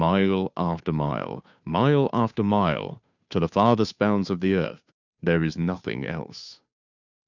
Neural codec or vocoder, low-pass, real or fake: codec, 16 kHz, 2 kbps, FunCodec, trained on Chinese and English, 25 frames a second; 7.2 kHz; fake